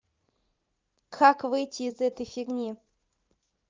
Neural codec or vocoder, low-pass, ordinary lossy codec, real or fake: vocoder, 44.1 kHz, 80 mel bands, Vocos; 7.2 kHz; Opus, 32 kbps; fake